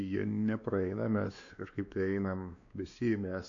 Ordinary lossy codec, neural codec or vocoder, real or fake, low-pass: AAC, 64 kbps; codec, 16 kHz, 2 kbps, X-Codec, WavLM features, trained on Multilingual LibriSpeech; fake; 7.2 kHz